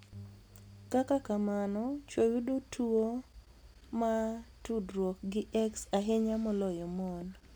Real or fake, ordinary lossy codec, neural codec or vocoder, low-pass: real; none; none; none